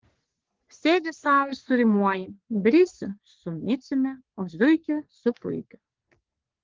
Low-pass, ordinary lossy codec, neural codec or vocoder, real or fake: 7.2 kHz; Opus, 16 kbps; codec, 44.1 kHz, 3.4 kbps, Pupu-Codec; fake